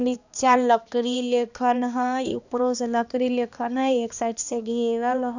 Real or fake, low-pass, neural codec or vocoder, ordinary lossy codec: fake; 7.2 kHz; codec, 16 kHz, 2 kbps, X-Codec, HuBERT features, trained on balanced general audio; none